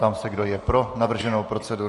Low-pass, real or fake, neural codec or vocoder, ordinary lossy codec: 14.4 kHz; real; none; MP3, 48 kbps